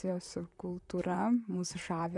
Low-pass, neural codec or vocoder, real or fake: 10.8 kHz; vocoder, 44.1 kHz, 128 mel bands, Pupu-Vocoder; fake